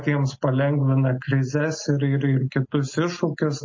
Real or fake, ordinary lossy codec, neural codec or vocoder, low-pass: real; MP3, 32 kbps; none; 7.2 kHz